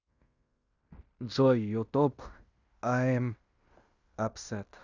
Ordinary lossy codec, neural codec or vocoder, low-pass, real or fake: Opus, 64 kbps; codec, 16 kHz in and 24 kHz out, 0.9 kbps, LongCat-Audio-Codec, fine tuned four codebook decoder; 7.2 kHz; fake